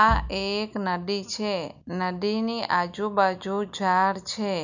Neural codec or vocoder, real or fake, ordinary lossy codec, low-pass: none; real; none; 7.2 kHz